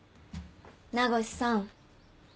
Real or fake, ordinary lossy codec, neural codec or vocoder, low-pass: real; none; none; none